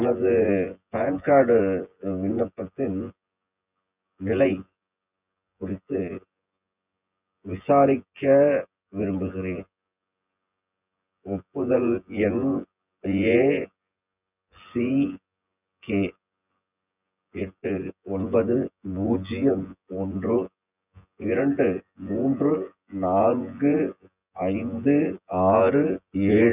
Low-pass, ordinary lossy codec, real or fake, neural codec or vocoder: 3.6 kHz; none; fake; vocoder, 24 kHz, 100 mel bands, Vocos